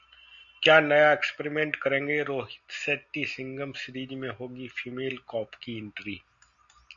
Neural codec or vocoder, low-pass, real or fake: none; 7.2 kHz; real